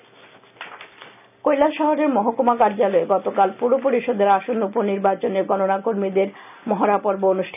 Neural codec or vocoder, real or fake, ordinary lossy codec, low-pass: none; real; none; 3.6 kHz